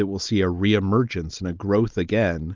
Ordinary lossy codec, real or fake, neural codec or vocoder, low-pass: Opus, 24 kbps; real; none; 7.2 kHz